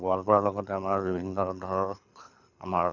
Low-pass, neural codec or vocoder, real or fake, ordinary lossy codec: 7.2 kHz; codec, 24 kHz, 6 kbps, HILCodec; fake; none